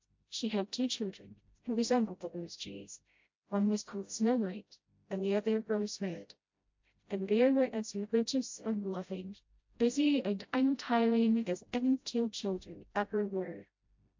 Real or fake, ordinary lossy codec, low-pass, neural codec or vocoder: fake; MP3, 48 kbps; 7.2 kHz; codec, 16 kHz, 0.5 kbps, FreqCodec, smaller model